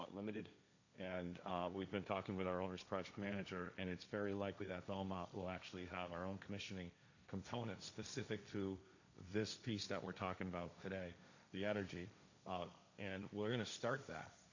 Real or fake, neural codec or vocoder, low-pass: fake; codec, 16 kHz, 1.1 kbps, Voila-Tokenizer; 7.2 kHz